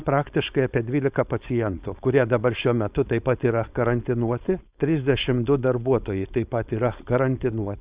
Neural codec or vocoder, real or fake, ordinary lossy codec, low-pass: codec, 16 kHz, 4.8 kbps, FACodec; fake; Opus, 64 kbps; 3.6 kHz